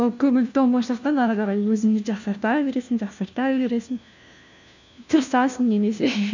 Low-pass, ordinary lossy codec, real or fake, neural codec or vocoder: 7.2 kHz; none; fake; codec, 16 kHz, 1 kbps, FunCodec, trained on LibriTTS, 50 frames a second